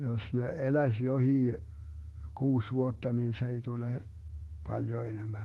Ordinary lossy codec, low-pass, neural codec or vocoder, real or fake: Opus, 16 kbps; 19.8 kHz; autoencoder, 48 kHz, 32 numbers a frame, DAC-VAE, trained on Japanese speech; fake